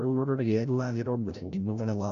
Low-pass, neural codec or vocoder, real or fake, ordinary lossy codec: 7.2 kHz; codec, 16 kHz, 0.5 kbps, FreqCodec, larger model; fake; none